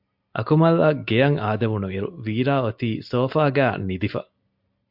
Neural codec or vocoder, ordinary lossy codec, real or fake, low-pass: none; MP3, 48 kbps; real; 5.4 kHz